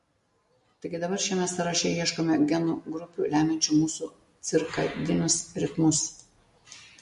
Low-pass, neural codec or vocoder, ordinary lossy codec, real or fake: 14.4 kHz; none; MP3, 48 kbps; real